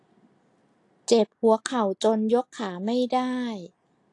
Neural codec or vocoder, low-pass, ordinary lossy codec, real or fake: none; 10.8 kHz; AAC, 48 kbps; real